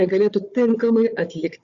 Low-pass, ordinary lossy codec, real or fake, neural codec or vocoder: 7.2 kHz; Opus, 64 kbps; fake; codec, 16 kHz, 4 kbps, FunCodec, trained on Chinese and English, 50 frames a second